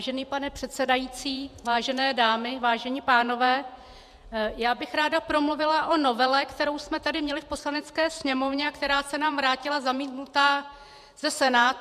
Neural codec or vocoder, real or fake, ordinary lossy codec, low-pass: vocoder, 48 kHz, 128 mel bands, Vocos; fake; MP3, 96 kbps; 14.4 kHz